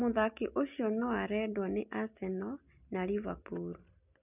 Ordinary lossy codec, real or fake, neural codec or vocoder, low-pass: none; real; none; 3.6 kHz